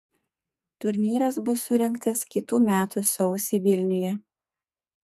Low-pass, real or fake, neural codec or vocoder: 14.4 kHz; fake; codec, 44.1 kHz, 2.6 kbps, SNAC